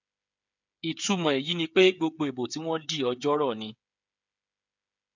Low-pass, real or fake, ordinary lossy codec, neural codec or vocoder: 7.2 kHz; fake; none; codec, 16 kHz, 8 kbps, FreqCodec, smaller model